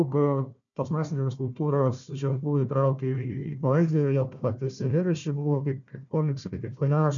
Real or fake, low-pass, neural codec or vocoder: fake; 7.2 kHz; codec, 16 kHz, 1 kbps, FunCodec, trained on Chinese and English, 50 frames a second